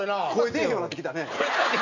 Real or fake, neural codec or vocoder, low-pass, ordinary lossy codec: real; none; 7.2 kHz; none